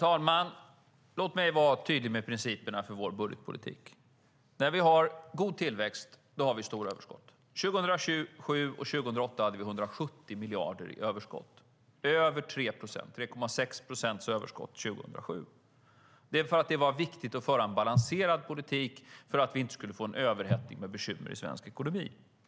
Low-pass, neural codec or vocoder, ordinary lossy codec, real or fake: none; none; none; real